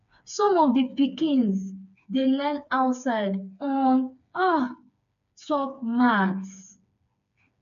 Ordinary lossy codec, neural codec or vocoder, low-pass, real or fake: none; codec, 16 kHz, 4 kbps, FreqCodec, smaller model; 7.2 kHz; fake